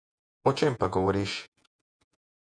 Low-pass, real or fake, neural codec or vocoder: 9.9 kHz; fake; vocoder, 48 kHz, 128 mel bands, Vocos